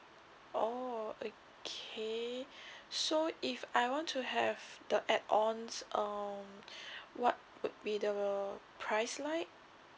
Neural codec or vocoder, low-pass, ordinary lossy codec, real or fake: none; none; none; real